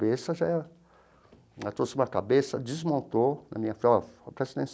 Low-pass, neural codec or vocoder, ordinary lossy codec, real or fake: none; none; none; real